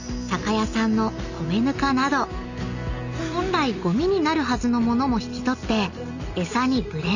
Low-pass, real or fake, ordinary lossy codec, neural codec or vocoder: 7.2 kHz; real; none; none